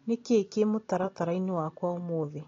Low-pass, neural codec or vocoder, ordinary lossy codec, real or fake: 7.2 kHz; none; AAC, 32 kbps; real